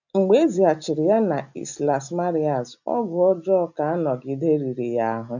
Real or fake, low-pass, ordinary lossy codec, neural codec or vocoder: real; 7.2 kHz; none; none